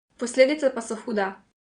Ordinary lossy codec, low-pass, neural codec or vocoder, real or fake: Opus, 64 kbps; 9.9 kHz; vocoder, 22.05 kHz, 80 mel bands, Vocos; fake